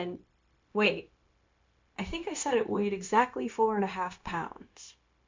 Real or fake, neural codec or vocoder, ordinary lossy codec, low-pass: fake; codec, 16 kHz, 0.9 kbps, LongCat-Audio-Codec; MP3, 64 kbps; 7.2 kHz